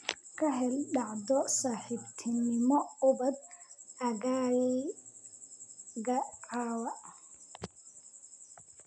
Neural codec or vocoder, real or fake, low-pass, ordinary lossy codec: none; real; 9.9 kHz; none